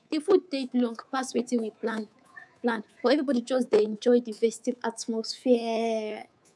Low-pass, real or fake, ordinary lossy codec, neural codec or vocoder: none; fake; none; codec, 24 kHz, 3.1 kbps, DualCodec